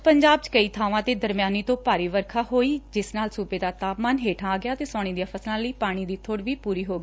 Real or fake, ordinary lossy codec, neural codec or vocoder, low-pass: real; none; none; none